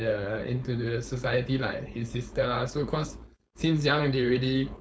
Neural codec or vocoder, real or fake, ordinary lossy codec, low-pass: codec, 16 kHz, 4.8 kbps, FACodec; fake; none; none